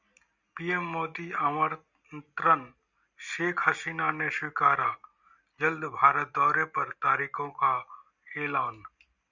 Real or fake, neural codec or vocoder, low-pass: real; none; 7.2 kHz